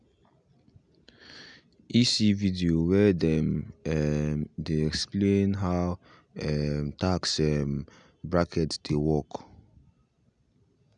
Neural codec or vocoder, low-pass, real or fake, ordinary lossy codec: none; 9.9 kHz; real; none